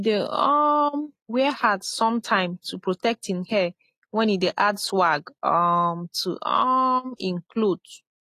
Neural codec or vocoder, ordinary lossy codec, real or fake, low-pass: none; AAC, 48 kbps; real; 14.4 kHz